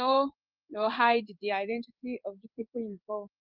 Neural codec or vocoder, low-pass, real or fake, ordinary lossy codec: codec, 16 kHz in and 24 kHz out, 1 kbps, XY-Tokenizer; 5.4 kHz; fake; Opus, 24 kbps